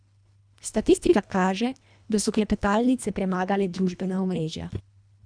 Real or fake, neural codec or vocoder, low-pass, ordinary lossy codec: fake; codec, 24 kHz, 1.5 kbps, HILCodec; 9.9 kHz; none